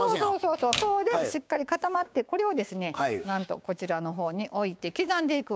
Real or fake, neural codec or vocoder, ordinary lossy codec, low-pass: fake; codec, 16 kHz, 6 kbps, DAC; none; none